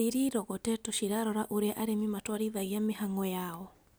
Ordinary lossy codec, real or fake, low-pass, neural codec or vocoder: none; real; none; none